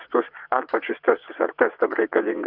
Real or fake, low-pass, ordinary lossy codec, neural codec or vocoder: fake; 5.4 kHz; Opus, 64 kbps; vocoder, 22.05 kHz, 80 mel bands, WaveNeXt